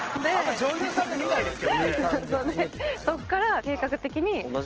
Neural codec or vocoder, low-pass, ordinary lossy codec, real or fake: none; 7.2 kHz; Opus, 16 kbps; real